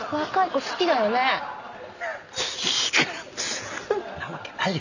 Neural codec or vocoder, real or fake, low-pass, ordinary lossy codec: none; real; 7.2 kHz; none